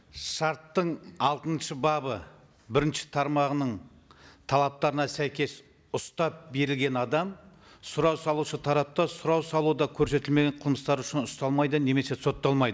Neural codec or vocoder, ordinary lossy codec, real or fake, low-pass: none; none; real; none